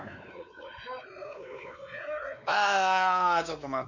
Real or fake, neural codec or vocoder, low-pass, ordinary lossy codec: fake; codec, 16 kHz, 2 kbps, X-Codec, WavLM features, trained on Multilingual LibriSpeech; 7.2 kHz; none